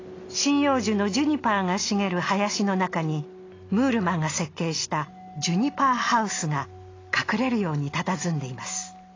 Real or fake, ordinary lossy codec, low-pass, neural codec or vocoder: real; AAC, 32 kbps; 7.2 kHz; none